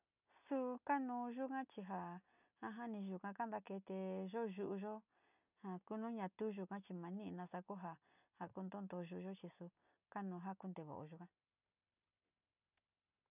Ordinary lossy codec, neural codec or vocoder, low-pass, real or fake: none; none; 3.6 kHz; real